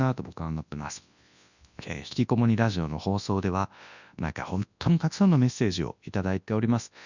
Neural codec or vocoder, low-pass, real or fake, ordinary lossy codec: codec, 24 kHz, 0.9 kbps, WavTokenizer, large speech release; 7.2 kHz; fake; none